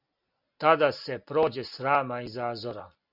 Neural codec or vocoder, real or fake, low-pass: none; real; 5.4 kHz